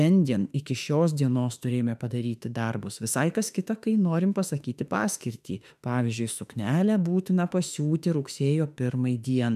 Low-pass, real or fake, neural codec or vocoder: 14.4 kHz; fake; autoencoder, 48 kHz, 32 numbers a frame, DAC-VAE, trained on Japanese speech